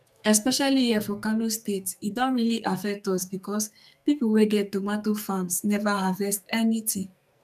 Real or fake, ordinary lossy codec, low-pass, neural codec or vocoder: fake; none; 14.4 kHz; codec, 44.1 kHz, 2.6 kbps, SNAC